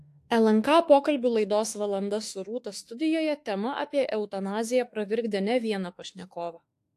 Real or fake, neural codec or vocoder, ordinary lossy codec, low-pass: fake; autoencoder, 48 kHz, 32 numbers a frame, DAC-VAE, trained on Japanese speech; AAC, 64 kbps; 14.4 kHz